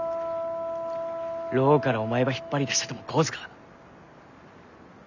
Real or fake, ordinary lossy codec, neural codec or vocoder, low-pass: real; none; none; 7.2 kHz